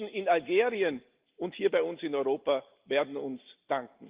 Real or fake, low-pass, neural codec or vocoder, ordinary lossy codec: real; 3.6 kHz; none; Opus, 32 kbps